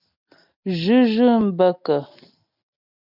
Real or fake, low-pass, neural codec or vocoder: real; 5.4 kHz; none